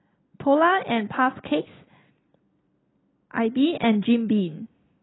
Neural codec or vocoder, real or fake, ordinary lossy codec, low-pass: none; real; AAC, 16 kbps; 7.2 kHz